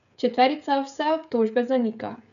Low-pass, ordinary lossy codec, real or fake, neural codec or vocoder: 7.2 kHz; none; fake; codec, 16 kHz, 16 kbps, FreqCodec, smaller model